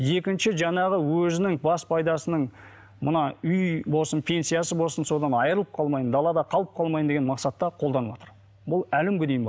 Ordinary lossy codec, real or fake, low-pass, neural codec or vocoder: none; real; none; none